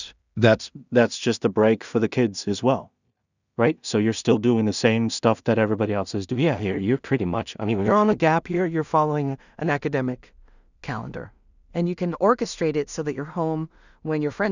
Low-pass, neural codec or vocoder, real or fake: 7.2 kHz; codec, 16 kHz in and 24 kHz out, 0.4 kbps, LongCat-Audio-Codec, two codebook decoder; fake